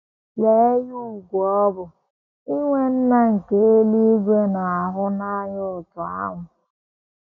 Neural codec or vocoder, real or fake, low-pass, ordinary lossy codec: none; real; 7.2 kHz; none